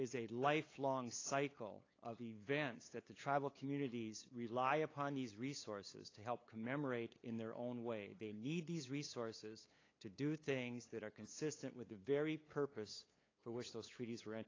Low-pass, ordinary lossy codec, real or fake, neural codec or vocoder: 7.2 kHz; AAC, 32 kbps; fake; codec, 16 kHz, 8 kbps, FunCodec, trained on LibriTTS, 25 frames a second